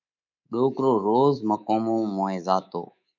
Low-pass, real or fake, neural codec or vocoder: 7.2 kHz; fake; codec, 24 kHz, 3.1 kbps, DualCodec